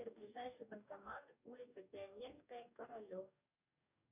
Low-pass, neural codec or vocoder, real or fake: 3.6 kHz; codec, 44.1 kHz, 2.6 kbps, DAC; fake